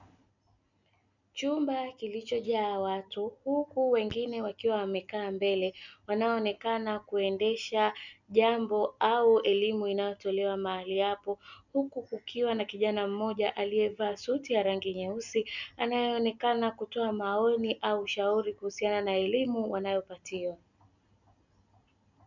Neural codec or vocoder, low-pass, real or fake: none; 7.2 kHz; real